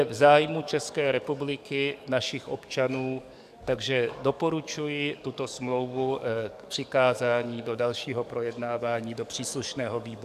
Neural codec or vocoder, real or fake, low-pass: codec, 44.1 kHz, 7.8 kbps, DAC; fake; 14.4 kHz